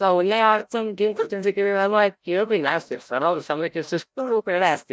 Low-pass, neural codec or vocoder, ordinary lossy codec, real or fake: none; codec, 16 kHz, 0.5 kbps, FreqCodec, larger model; none; fake